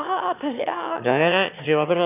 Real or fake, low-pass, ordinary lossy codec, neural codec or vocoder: fake; 3.6 kHz; none; autoencoder, 22.05 kHz, a latent of 192 numbers a frame, VITS, trained on one speaker